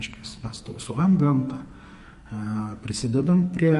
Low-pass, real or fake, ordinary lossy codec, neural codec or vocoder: 14.4 kHz; fake; MP3, 48 kbps; codec, 44.1 kHz, 2.6 kbps, SNAC